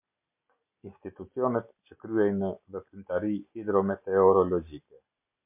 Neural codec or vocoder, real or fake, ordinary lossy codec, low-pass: none; real; MP3, 32 kbps; 3.6 kHz